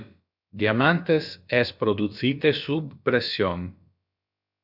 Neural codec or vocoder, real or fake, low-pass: codec, 16 kHz, about 1 kbps, DyCAST, with the encoder's durations; fake; 5.4 kHz